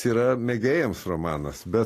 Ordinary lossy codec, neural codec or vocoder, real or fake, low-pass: AAC, 48 kbps; none; real; 14.4 kHz